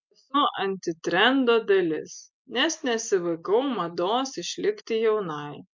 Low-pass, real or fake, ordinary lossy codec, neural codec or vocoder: 7.2 kHz; real; MP3, 64 kbps; none